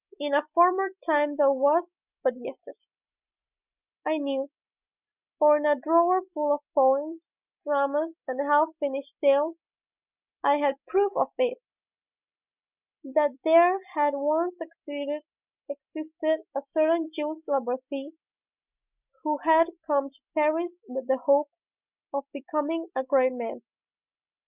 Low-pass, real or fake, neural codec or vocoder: 3.6 kHz; real; none